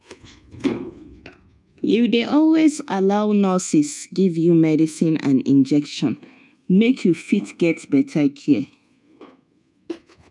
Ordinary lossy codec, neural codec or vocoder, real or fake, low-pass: none; codec, 24 kHz, 1.2 kbps, DualCodec; fake; 10.8 kHz